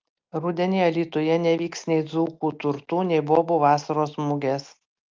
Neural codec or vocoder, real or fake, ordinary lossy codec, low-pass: none; real; Opus, 24 kbps; 7.2 kHz